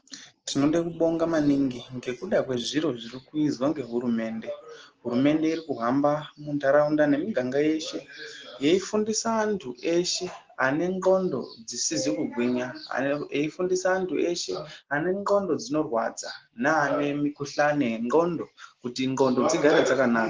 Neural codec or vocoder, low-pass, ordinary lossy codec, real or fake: none; 7.2 kHz; Opus, 16 kbps; real